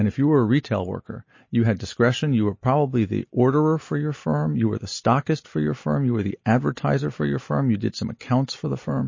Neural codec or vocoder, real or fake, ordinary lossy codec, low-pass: none; real; MP3, 32 kbps; 7.2 kHz